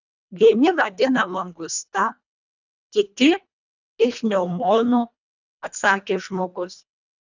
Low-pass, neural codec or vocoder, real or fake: 7.2 kHz; codec, 24 kHz, 1.5 kbps, HILCodec; fake